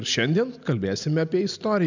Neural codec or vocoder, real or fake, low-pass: none; real; 7.2 kHz